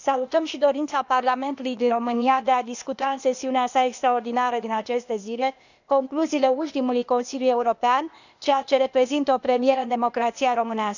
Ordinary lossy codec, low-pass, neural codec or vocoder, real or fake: none; 7.2 kHz; codec, 16 kHz, 0.8 kbps, ZipCodec; fake